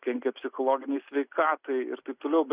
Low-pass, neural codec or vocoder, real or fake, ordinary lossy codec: 3.6 kHz; none; real; Opus, 64 kbps